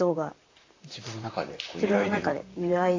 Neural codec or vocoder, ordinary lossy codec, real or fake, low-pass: vocoder, 44.1 kHz, 128 mel bands, Pupu-Vocoder; AAC, 32 kbps; fake; 7.2 kHz